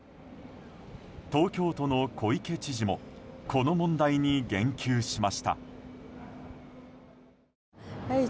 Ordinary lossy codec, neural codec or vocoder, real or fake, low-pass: none; none; real; none